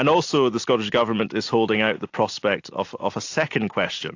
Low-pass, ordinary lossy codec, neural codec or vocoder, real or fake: 7.2 kHz; AAC, 48 kbps; none; real